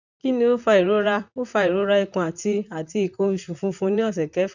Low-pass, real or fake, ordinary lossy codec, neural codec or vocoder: 7.2 kHz; fake; none; vocoder, 44.1 kHz, 128 mel bands, Pupu-Vocoder